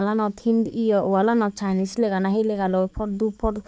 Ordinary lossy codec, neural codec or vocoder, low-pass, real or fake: none; codec, 16 kHz, 4 kbps, X-Codec, HuBERT features, trained on balanced general audio; none; fake